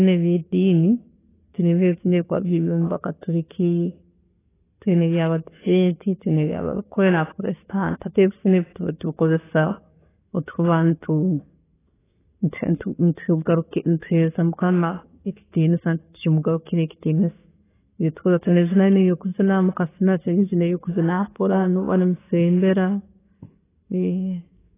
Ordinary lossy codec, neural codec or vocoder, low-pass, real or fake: AAC, 16 kbps; none; 3.6 kHz; real